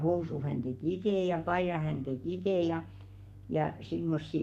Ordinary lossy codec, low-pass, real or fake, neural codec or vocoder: none; 14.4 kHz; fake; codec, 32 kHz, 1.9 kbps, SNAC